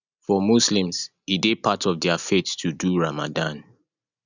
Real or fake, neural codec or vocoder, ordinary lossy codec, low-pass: real; none; none; 7.2 kHz